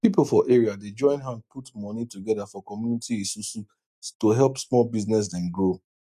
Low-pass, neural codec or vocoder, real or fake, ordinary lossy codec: 14.4 kHz; none; real; none